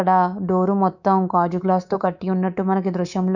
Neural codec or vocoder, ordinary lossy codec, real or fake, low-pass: codec, 24 kHz, 3.1 kbps, DualCodec; none; fake; 7.2 kHz